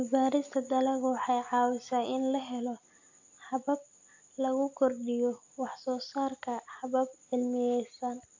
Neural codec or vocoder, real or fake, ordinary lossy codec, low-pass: none; real; AAC, 48 kbps; 7.2 kHz